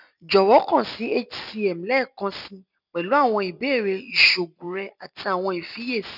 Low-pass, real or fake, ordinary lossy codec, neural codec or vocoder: 5.4 kHz; real; none; none